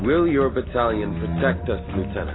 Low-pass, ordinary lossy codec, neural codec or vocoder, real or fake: 7.2 kHz; AAC, 16 kbps; none; real